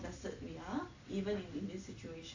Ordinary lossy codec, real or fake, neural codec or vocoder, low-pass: AAC, 48 kbps; real; none; 7.2 kHz